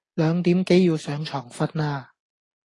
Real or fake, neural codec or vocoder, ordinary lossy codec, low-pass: real; none; AAC, 32 kbps; 10.8 kHz